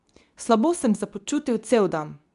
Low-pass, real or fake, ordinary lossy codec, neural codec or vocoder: 10.8 kHz; fake; none; codec, 24 kHz, 0.9 kbps, WavTokenizer, medium speech release version 2